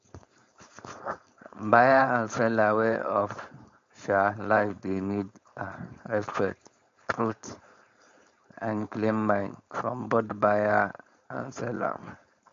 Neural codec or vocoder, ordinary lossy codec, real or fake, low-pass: codec, 16 kHz, 4.8 kbps, FACodec; MP3, 48 kbps; fake; 7.2 kHz